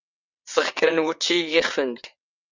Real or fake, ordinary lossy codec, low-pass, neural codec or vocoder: fake; Opus, 64 kbps; 7.2 kHz; vocoder, 22.05 kHz, 80 mel bands, WaveNeXt